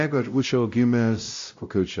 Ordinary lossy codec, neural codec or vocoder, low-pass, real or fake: AAC, 48 kbps; codec, 16 kHz, 0.5 kbps, X-Codec, WavLM features, trained on Multilingual LibriSpeech; 7.2 kHz; fake